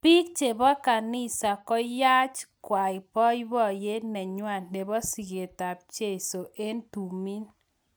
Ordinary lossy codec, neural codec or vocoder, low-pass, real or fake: none; none; none; real